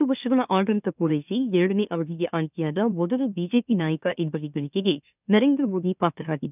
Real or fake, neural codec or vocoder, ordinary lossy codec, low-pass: fake; autoencoder, 44.1 kHz, a latent of 192 numbers a frame, MeloTTS; none; 3.6 kHz